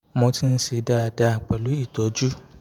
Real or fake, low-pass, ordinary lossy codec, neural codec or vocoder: fake; 19.8 kHz; none; vocoder, 48 kHz, 128 mel bands, Vocos